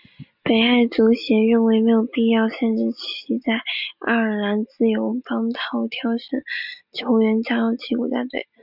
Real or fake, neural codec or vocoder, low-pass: real; none; 5.4 kHz